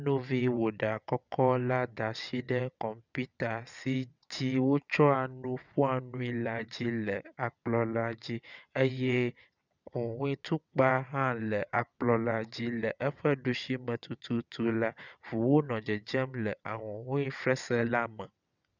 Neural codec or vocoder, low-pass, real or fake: vocoder, 22.05 kHz, 80 mel bands, WaveNeXt; 7.2 kHz; fake